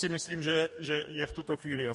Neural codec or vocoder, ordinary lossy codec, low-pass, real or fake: codec, 44.1 kHz, 2.6 kbps, DAC; MP3, 48 kbps; 14.4 kHz; fake